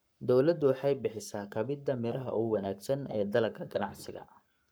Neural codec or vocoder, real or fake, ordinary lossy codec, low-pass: codec, 44.1 kHz, 7.8 kbps, Pupu-Codec; fake; none; none